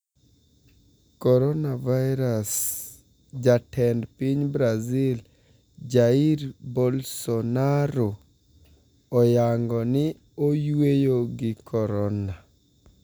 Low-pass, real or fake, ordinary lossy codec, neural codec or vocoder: none; real; none; none